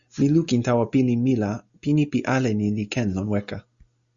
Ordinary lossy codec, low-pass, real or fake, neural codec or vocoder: Opus, 64 kbps; 7.2 kHz; real; none